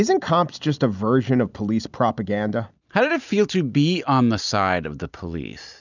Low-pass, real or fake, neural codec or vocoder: 7.2 kHz; real; none